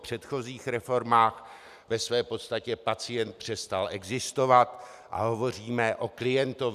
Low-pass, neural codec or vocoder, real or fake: 14.4 kHz; none; real